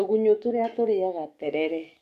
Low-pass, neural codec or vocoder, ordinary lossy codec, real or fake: 14.4 kHz; codec, 44.1 kHz, 7.8 kbps, DAC; none; fake